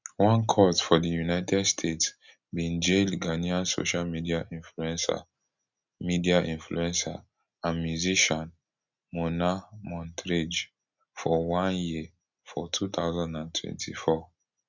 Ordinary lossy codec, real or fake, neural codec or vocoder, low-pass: none; real; none; 7.2 kHz